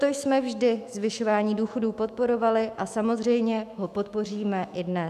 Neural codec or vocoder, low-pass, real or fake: autoencoder, 48 kHz, 128 numbers a frame, DAC-VAE, trained on Japanese speech; 14.4 kHz; fake